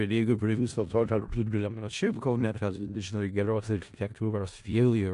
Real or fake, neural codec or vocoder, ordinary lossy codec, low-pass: fake; codec, 16 kHz in and 24 kHz out, 0.4 kbps, LongCat-Audio-Codec, four codebook decoder; AAC, 64 kbps; 10.8 kHz